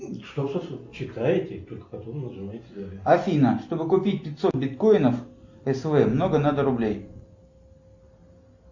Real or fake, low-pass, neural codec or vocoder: real; 7.2 kHz; none